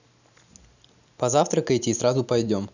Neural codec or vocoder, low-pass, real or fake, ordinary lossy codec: none; 7.2 kHz; real; none